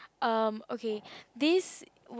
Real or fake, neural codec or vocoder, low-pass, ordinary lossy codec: real; none; none; none